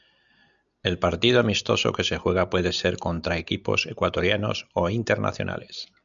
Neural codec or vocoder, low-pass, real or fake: none; 7.2 kHz; real